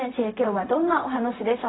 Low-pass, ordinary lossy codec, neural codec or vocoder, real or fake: 7.2 kHz; AAC, 16 kbps; codec, 16 kHz, 0.4 kbps, LongCat-Audio-Codec; fake